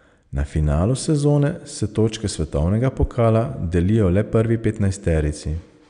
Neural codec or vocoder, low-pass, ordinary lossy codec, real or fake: none; 9.9 kHz; none; real